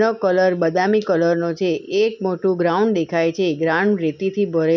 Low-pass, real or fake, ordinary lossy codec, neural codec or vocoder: 7.2 kHz; real; none; none